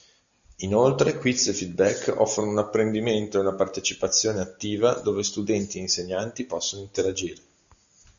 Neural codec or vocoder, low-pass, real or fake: none; 7.2 kHz; real